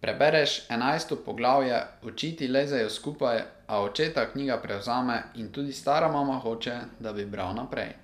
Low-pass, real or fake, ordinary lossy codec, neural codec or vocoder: 14.4 kHz; real; none; none